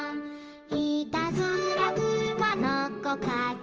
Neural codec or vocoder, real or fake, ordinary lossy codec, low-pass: codec, 16 kHz in and 24 kHz out, 1 kbps, XY-Tokenizer; fake; Opus, 24 kbps; 7.2 kHz